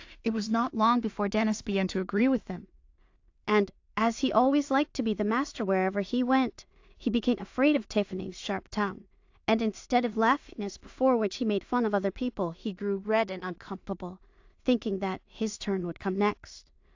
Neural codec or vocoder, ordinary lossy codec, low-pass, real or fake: codec, 16 kHz in and 24 kHz out, 0.4 kbps, LongCat-Audio-Codec, two codebook decoder; AAC, 48 kbps; 7.2 kHz; fake